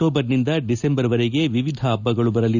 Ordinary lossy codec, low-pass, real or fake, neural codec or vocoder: none; 7.2 kHz; real; none